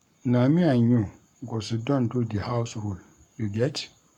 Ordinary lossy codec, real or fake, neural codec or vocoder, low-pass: none; fake; codec, 44.1 kHz, 7.8 kbps, Pupu-Codec; 19.8 kHz